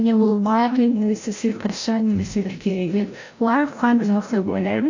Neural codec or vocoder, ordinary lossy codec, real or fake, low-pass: codec, 16 kHz, 0.5 kbps, FreqCodec, larger model; AAC, 48 kbps; fake; 7.2 kHz